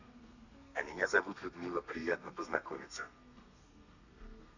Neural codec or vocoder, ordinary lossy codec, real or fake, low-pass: codec, 32 kHz, 1.9 kbps, SNAC; none; fake; 7.2 kHz